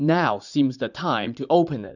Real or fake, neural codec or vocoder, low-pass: fake; vocoder, 44.1 kHz, 80 mel bands, Vocos; 7.2 kHz